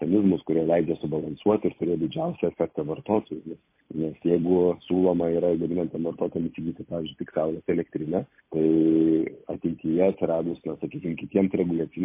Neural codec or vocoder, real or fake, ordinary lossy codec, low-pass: vocoder, 44.1 kHz, 128 mel bands every 256 samples, BigVGAN v2; fake; MP3, 24 kbps; 3.6 kHz